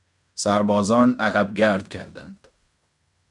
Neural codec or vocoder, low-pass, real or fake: codec, 16 kHz in and 24 kHz out, 0.9 kbps, LongCat-Audio-Codec, fine tuned four codebook decoder; 10.8 kHz; fake